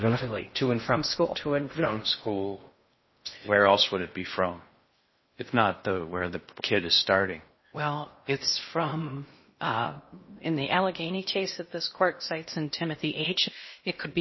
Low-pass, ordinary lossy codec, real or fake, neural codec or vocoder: 7.2 kHz; MP3, 24 kbps; fake; codec, 16 kHz in and 24 kHz out, 0.6 kbps, FocalCodec, streaming, 4096 codes